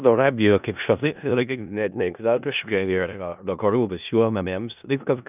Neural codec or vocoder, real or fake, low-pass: codec, 16 kHz in and 24 kHz out, 0.4 kbps, LongCat-Audio-Codec, four codebook decoder; fake; 3.6 kHz